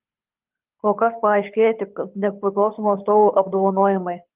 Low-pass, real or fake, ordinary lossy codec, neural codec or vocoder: 3.6 kHz; fake; Opus, 24 kbps; codec, 24 kHz, 6 kbps, HILCodec